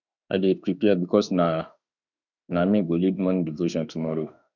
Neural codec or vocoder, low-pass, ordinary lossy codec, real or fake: autoencoder, 48 kHz, 32 numbers a frame, DAC-VAE, trained on Japanese speech; 7.2 kHz; none; fake